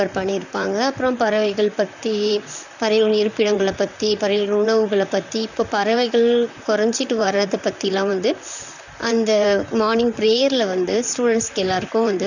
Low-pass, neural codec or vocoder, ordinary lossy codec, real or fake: 7.2 kHz; vocoder, 44.1 kHz, 128 mel bands, Pupu-Vocoder; none; fake